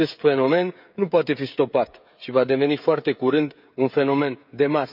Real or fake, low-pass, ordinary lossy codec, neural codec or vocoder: fake; 5.4 kHz; none; codec, 16 kHz, 16 kbps, FreqCodec, smaller model